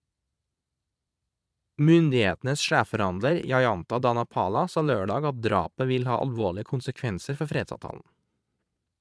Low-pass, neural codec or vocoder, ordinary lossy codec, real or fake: none; vocoder, 22.05 kHz, 80 mel bands, Vocos; none; fake